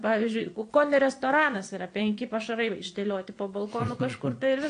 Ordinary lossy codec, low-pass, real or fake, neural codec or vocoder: AAC, 48 kbps; 9.9 kHz; fake; vocoder, 22.05 kHz, 80 mel bands, Vocos